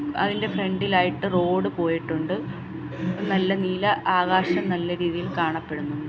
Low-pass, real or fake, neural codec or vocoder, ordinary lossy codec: none; real; none; none